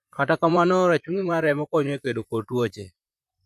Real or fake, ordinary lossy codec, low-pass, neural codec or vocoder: fake; none; 14.4 kHz; vocoder, 44.1 kHz, 128 mel bands, Pupu-Vocoder